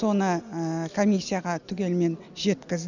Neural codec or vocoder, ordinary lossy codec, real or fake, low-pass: none; none; real; 7.2 kHz